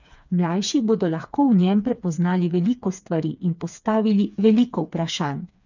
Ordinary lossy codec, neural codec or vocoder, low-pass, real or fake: none; codec, 16 kHz, 4 kbps, FreqCodec, smaller model; 7.2 kHz; fake